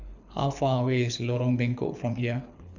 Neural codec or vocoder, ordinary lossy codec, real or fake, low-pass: codec, 24 kHz, 6 kbps, HILCodec; none; fake; 7.2 kHz